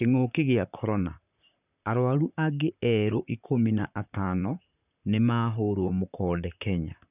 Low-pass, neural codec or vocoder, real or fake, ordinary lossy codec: 3.6 kHz; vocoder, 44.1 kHz, 128 mel bands, Pupu-Vocoder; fake; none